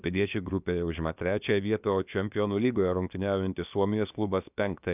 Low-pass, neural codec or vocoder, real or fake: 3.6 kHz; codec, 16 kHz, about 1 kbps, DyCAST, with the encoder's durations; fake